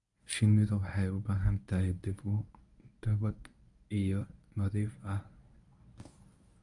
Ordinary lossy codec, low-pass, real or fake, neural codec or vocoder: none; 10.8 kHz; fake; codec, 24 kHz, 0.9 kbps, WavTokenizer, medium speech release version 1